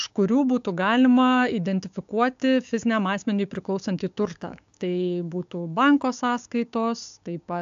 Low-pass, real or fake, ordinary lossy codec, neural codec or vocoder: 7.2 kHz; fake; AAC, 64 kbps; codec, 16 kHz, 6 kbps, DAC